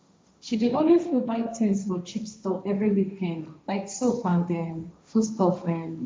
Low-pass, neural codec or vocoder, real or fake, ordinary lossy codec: none; codec, 16 kHz, 1.1 kbps, Voila-Tokenizer; fake; none